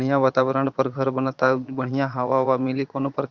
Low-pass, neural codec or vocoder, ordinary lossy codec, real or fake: 7.2 kHz; vocoder, 22.05 kHz, 80 mel bands, Vocos; Opus, 64 kbps; fake